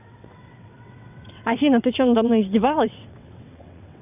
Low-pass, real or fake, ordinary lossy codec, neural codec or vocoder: 3.6 kHz; fake; none; vocoder, 22.05 kHz, 80 mel bands, WaveNeXt